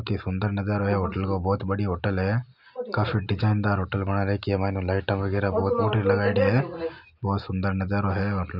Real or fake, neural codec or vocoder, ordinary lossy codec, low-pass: real; none; none; 5.4 kHz